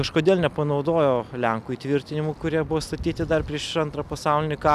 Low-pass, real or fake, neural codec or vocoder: 14.4 kHz; real; none